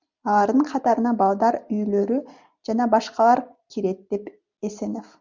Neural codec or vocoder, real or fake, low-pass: none; real; 7.2 kHz